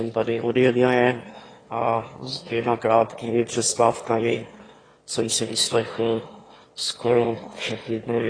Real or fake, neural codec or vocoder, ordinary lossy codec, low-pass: fake; autoencoder, 22.05 kHz, a latent of 192 numbers a frame, VITS, trained on one speaker; AAC, 32 kbps; 9.9 kHz